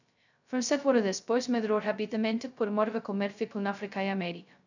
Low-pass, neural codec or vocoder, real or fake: 7.2 kHz; codec, 16 kHz, 0.2 kbps, FocalCodec; fake